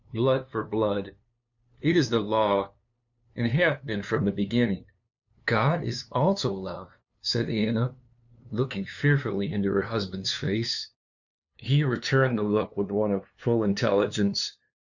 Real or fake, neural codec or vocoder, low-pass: fake; codec, 16 kHz, 2 kbps, FunCodec, trained on LibriTTS, 25 frames a second; 7.2 kHz